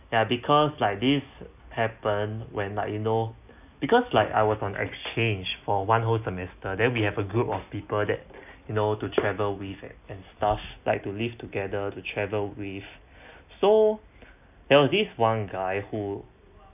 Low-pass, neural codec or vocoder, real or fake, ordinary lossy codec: 3.6 kHz; none; real; none